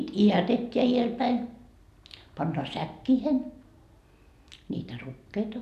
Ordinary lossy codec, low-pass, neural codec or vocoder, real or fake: none; 14.4 kHz; none; real